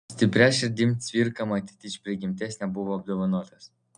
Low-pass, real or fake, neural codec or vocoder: 9.9 kHz; real; none